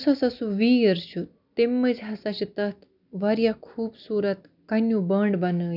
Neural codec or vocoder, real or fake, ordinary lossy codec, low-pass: none; real; none; 5.4 kHz